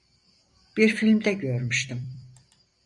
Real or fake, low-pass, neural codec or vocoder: fake; 10.8 kHz; vocoder, 44.1 kHz, 128 mel bands every 256 samples, BigVGAN v2